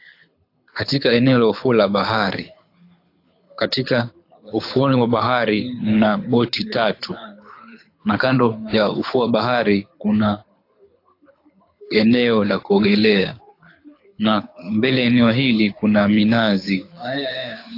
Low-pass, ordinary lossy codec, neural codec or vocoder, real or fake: 5.4 kHz; AAC, 32 kbps; codec, 24 kHz, 6 kbps, HILCodec; fake